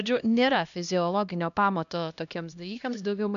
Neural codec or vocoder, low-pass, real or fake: codec, 16 kHz, 1 kbps, X-Codec, HuBERT features, trained on LibriSpeech; 7.2 kHz; fake